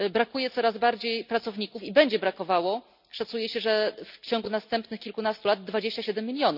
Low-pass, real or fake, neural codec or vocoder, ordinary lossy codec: 5.4 kHz; real; none; none